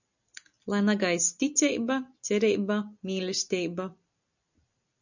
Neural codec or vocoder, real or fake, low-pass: none; real; 7.2 kHz